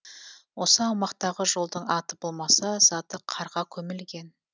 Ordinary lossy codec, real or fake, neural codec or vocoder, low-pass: none; real; none; none